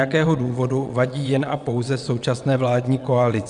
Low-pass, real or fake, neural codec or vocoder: 9.9 kHz; fake; vocoder, 22.05 kHz, 80 mel bands, WaveNeXt